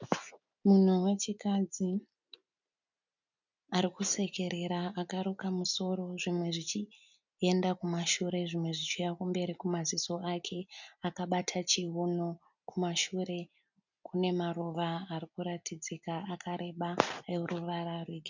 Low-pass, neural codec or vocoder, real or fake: 7.2 kHz; none; real